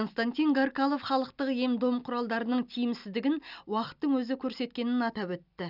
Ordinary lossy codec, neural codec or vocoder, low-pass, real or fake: none; none; 5.4 kHz; real